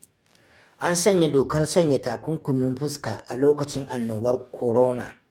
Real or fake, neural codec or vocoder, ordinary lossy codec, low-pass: fake; codec, 44.1 kHz, 2.6 kbps, DAC; MP3, 96 kbps; 19.8 kHz